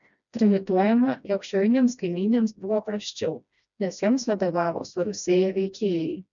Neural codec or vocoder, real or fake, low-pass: codec, 16 kHz, 1 kbps, FreqCodec, smaller model; fake; 7.2 kHz